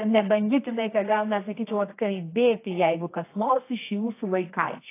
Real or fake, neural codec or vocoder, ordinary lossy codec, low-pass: fake; codec, 24 kHz, 0.9 kbps, WavTokenizer, medium music audio release; AAC, 24 kbps; 3.6 kHz